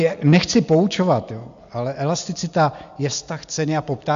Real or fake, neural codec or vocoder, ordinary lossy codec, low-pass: real; none; MP3, 64 kbps; 7.2 kHz